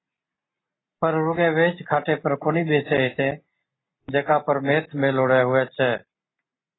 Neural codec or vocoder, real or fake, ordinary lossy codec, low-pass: none; real; AAC, 16 kbps; 7.2 kHz